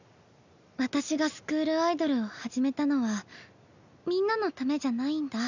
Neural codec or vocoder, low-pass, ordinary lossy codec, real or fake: none; 7.2 kHz; none; real